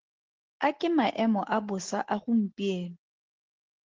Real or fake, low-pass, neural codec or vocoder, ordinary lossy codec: real; 7.2 kHz; none; Opus, 16 kbps